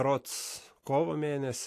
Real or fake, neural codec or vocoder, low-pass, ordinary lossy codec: real; none; 14.4 kHz; AAC, 64 kbps